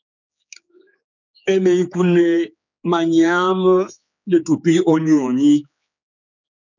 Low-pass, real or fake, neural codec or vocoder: 7.2 kHz; fake; codec, 16 kHz, 4 kbps, X-Codec, HuBERT features, trained on general audio